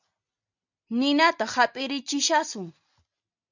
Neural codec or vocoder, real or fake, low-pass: none; real; 7.2 kHz